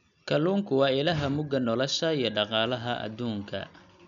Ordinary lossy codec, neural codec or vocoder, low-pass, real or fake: none; none; 7.2 kHz; real